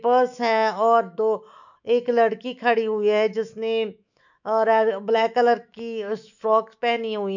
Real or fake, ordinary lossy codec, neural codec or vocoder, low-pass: fake; none; autoencoder, 48 kHz, 128 numbers a frame, DAC-VAE, trained on Japanese speech; 7.2 kHz